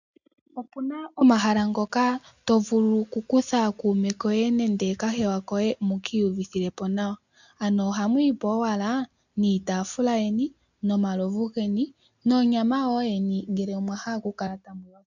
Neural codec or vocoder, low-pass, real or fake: none; 7.2 kHz; real